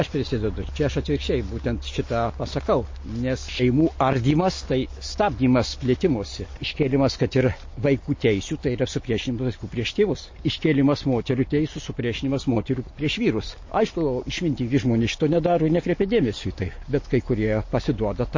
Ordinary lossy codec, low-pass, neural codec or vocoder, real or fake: MP3, 32 kbps; 7.2 kHz; none; real